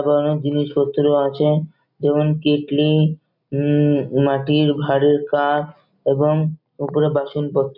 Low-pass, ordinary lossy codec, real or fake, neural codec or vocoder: 5.4 kHz; none; real; none